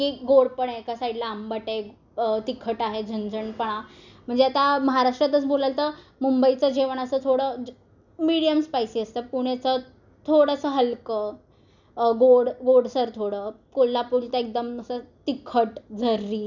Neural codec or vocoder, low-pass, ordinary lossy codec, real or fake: none; 7.2 kHz; none; real